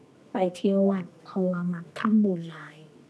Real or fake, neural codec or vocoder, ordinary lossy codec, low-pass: fake; codec, 24 kHz, 0.9 kbps, WavTokenizer, medium music audio release; none; none